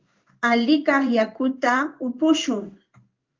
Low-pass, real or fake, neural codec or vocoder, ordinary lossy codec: 7.2 kHz; fake; codec, 16 kHz in and 24 kHz out, 1 kbps, XY-Tokenizer; Opus, 24 kbps